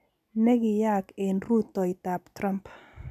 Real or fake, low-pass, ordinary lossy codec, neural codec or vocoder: real; 14.4 kHz; none; none